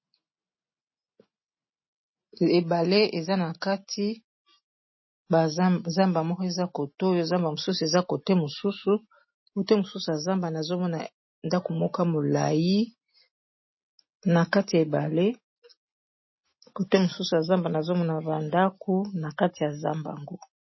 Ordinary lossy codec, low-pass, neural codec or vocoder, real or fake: MP3, 24 kbps; 7.2 kHz; none; real